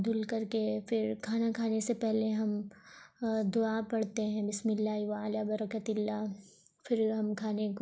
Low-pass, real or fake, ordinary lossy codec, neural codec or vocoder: none; real; none; none